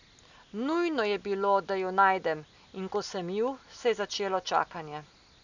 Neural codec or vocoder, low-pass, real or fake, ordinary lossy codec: none; 7.2 kHz; real; none